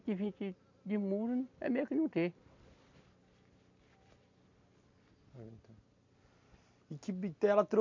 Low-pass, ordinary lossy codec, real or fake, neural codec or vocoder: 7.2 kHz; MP3, 64 kbps; real; none